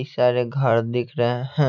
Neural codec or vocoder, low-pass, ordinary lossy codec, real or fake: none; 7.2 kHz; none; real